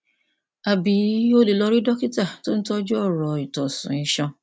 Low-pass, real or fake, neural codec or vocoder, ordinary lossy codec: none; real; none; none